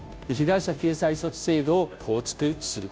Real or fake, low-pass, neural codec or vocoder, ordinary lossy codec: fake; none; codec, 16 kHz, 0.5 kbps, FunCodec, trained on Chinese and English, 25 frames a second; none